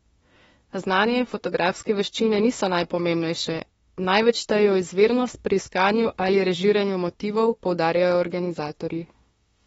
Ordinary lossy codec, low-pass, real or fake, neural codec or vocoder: AAC, 24 kbps; 19.8 kHz; fake; autoencoder, 48 kHz, 32 numbers a frame, DAC-VAE, trained on Japanese speech